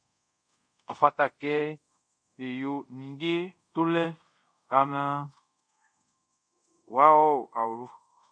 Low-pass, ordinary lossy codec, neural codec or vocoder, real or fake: 9.9 kHz; MP3, 64 kbps; codec, 24 kHz, 0.5 kbps, DualCodec; fake